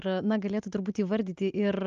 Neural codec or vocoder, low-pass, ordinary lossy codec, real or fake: none; 7.2 kHz; Opus, 24 kbps; real